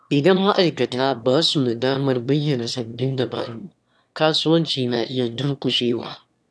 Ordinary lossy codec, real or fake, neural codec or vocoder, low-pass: none; fake; autoencoder, 22.05 kHz, a latent of 192 numbers a frame, VITS, trained on one speaker; none